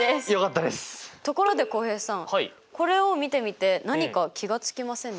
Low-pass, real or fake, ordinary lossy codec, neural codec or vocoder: none; real; none; none